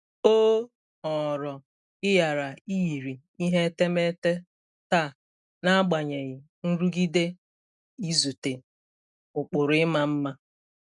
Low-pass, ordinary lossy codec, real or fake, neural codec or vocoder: 10.8 kHz; none; real; none